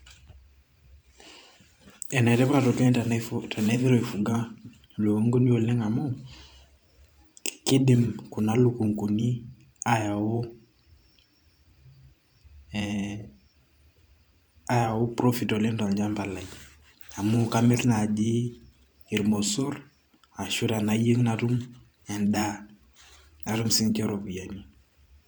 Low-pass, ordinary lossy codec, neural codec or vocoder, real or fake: none; none; vocoder, 44.1 kHz, 128 mel bands every 512 samples, BigVGAN v2; fake